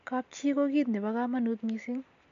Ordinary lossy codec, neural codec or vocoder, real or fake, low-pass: AAC, 64 kbps; none; real; 7.2 kHz